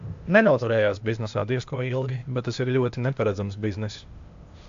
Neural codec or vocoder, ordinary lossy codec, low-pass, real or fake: codec, 16 kHz, 0.8 kbps, ZipCodec; AAC, 64 kbps; 7.2 kHz; fake